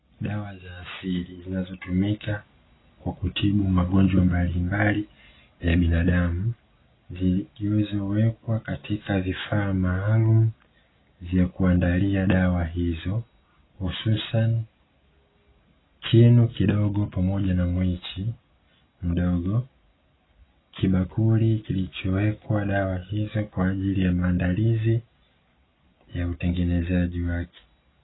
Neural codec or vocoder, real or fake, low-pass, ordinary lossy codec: none; real; 7.2 kHz; AAC, 16 kbps